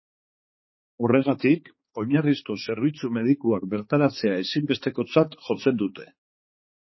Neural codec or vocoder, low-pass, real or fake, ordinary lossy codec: codec, 16 kHz, 4 kbps, X-Codec, HuBERT features, trained on balanced general audio; 7.2 kHz; fake; MP3, 24 kbps